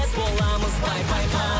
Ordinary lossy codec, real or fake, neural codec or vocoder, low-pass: none; real; none; none